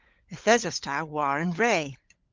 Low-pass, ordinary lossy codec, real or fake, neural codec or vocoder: 7.2 kHz; Opus, 32 kbps; fake; codec, 16 kHz, 16 kbps, FunCodec, trained on LibriTTS, 50 frames a second